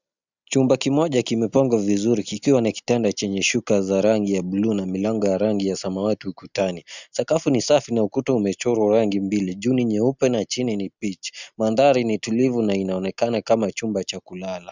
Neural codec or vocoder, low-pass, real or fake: none; 7.2 kHz; real